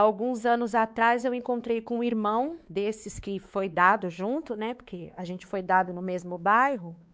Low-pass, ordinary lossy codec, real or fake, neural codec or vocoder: none; none; fake; codec, 16 kHz, 4 kbps, X-Codec, WavLM features, trained on Multilingual LibriSpeech